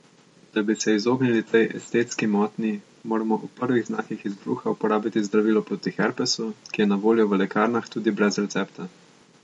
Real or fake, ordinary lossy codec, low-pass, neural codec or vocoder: real; MP3, 48 kbps; 19.8 kHz; none